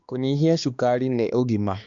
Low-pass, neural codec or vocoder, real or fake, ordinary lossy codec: 7.2 kHz; codec, 16 kHz, 2 kbps, X-Codec, HuBERT features, trained on balanced general audio; fake; Opus, 64 kbps